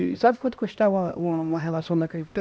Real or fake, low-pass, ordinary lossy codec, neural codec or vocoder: fake; none; none; codec, 16 kHz, 1 kbps, X-Codec, HuBERT features, trained on LibriSpeech